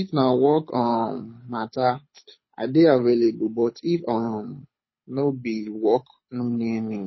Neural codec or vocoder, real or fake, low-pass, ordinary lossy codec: codec, 24 kHz, 6 kbps, HILCodec; fake; 7.2 kHz; MP3, 24 kbps